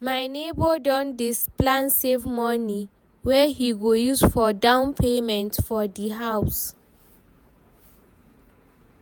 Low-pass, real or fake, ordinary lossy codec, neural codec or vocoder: none; fake; none; vocoder, 48 kHz, 128 mel bands, Vocos